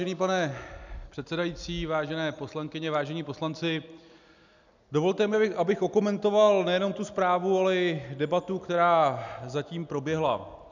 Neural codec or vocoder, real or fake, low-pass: none; real; 7.2 kHz